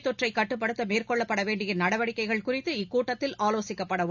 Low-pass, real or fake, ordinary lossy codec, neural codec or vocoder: 7.2 kHz; real; none; none